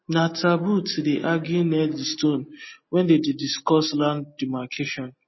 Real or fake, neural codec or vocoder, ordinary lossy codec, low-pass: real; none; MP3, 24 kbps; 7.2 kHz